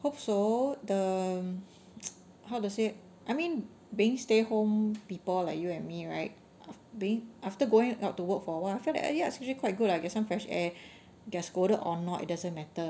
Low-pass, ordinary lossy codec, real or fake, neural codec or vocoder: none; none; real; none